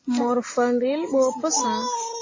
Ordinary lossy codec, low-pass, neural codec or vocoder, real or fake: AAC, 32 kbps; 7.2 kHz; none; real